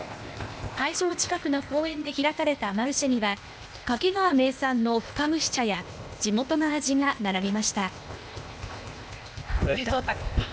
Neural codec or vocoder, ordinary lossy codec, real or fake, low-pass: codec, 16 kHz, 0.8 kbps, ZipCodec; none; fake; none